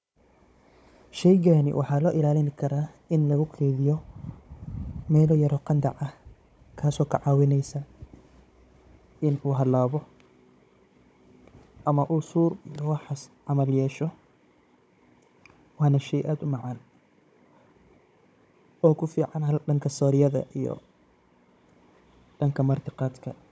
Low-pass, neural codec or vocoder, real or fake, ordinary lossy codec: none; codec, 16 kHz, 16 kbps, FunCodec, trained on Chinese and English, 50 frames a second; fake; none